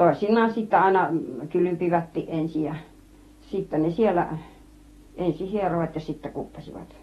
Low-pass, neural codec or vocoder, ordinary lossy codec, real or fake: 19.8 kHz; vocoder, 48 kHz, 128 mel bands, Vocos; AAC, 32 kbps; fake